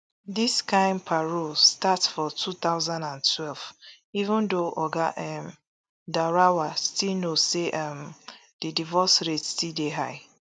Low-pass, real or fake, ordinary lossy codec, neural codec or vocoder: none; real; none; none